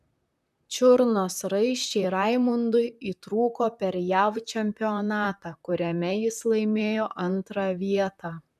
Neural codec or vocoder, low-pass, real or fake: vocoder, 44.1 kHz, 128 mel bands, Pupu-Vocoder; 14.4 kHz; fake